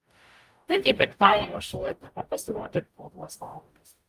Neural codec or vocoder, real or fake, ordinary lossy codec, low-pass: codec, 44.1 kHz, 0.9 kbps, DAC; fake; Opus, 32 kbps; 14.4 kHz